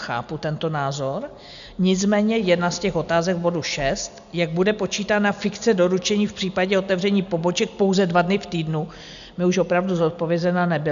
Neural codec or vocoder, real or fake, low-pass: none; real; 7.2 kHz